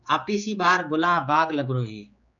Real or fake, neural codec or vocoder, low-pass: fake; codec, 16 kHz, 4 kbps, X-Codec, HuBERT features, trained on general audio; 7.2 kHz